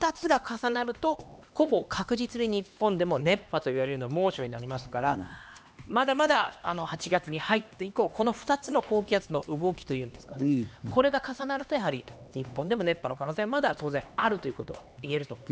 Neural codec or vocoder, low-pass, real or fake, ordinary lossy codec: codec, 16 kHz, 2 kbps, X-Codec, HuBERT features, trained on LibriSpeech; none; fake; none